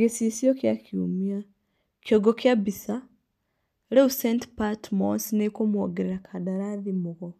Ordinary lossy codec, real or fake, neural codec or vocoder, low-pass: MP3, 96 kbps; real; none; 14.4 kHz